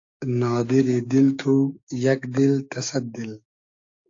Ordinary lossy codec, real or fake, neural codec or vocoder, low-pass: AAC, 48 kbps; fake; codec, 16 kHz, 6 kbps, DAC; 7.2 kHz